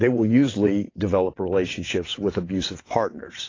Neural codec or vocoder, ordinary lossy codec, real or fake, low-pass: vocoder, 22.05 kHz, 80 mel bands, WaveNeXt; AAC, 32 kbps; fake; 7.2 kHz